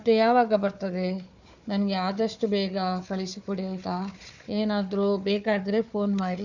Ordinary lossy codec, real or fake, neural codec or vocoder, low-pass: none; fake; codec, 16 kHz, 4 kbps, FunCodec, trained on Chinese and English, 50 frames a second; 7.2 kHz